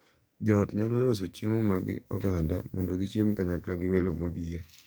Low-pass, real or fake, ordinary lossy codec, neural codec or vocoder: none; fake; none; codec, 44.1 kHz, 2.6 kbps, SNAC